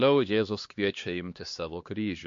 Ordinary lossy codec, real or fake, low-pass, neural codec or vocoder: MP3, 48 kbps; fake; 7.2 kHz; codec, 16 kHz, 2 kbps, X-Codec, HuBERT features, trained on LibriSpeech